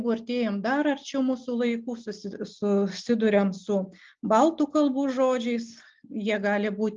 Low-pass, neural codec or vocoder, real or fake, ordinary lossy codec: 7.2 kHz; none; real; Opus, 32 kbps